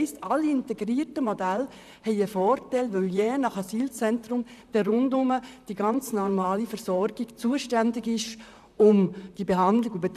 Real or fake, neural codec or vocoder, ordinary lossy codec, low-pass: fake; vocoder, 44.1 kHz, 128 mel bands, Pupu-Vocoder; none; 14.4 kHz